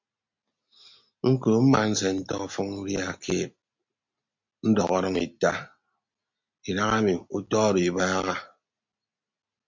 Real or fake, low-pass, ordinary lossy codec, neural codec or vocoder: real; 7.2 kHz; MP3, 48 kbps; none